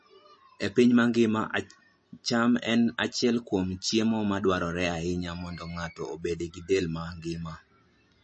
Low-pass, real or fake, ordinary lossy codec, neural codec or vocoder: 10.8 kHz; real; MP3, 32 kbps; none